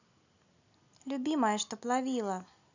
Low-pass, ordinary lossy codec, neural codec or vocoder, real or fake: 7.2 kHz; none; none; real